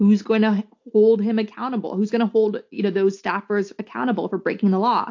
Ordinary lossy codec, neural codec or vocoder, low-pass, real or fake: AAC, 48 kbps; none; 7.2 kHz; real